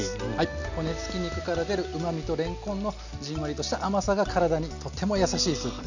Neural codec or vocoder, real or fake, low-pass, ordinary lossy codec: none; real; 7.2 kHz; none